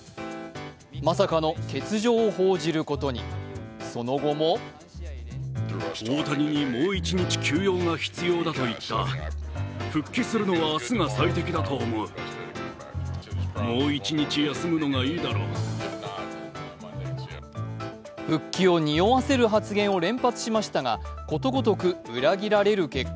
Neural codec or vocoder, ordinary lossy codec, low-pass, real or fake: none; none; none; real